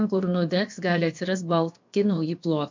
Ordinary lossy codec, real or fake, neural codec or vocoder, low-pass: MP3, 64 kbps; fake; codec, 16 kHz, about 1 kbps, DyCAST, with the encoder's durations; 7.2 kHz